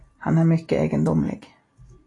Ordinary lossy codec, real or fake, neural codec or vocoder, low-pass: AAC, 32 kbps; real; none; 10.8 kHz